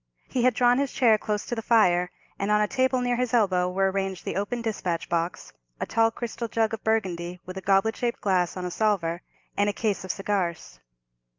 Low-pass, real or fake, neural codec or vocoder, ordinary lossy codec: 7.2 kHz; real; none; Opus, 24 kbps